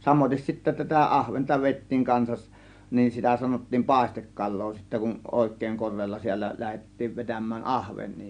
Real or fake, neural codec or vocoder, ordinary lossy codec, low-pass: real; none; MP3, 64 kbps; 9.9 kHz